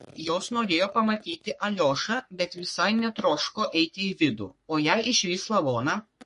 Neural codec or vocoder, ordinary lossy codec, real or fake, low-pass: codec, 44.1 kHz, 3.4 kbps, Pupu-Codec; MP3, 48 kbps; fake; 14.4 kHz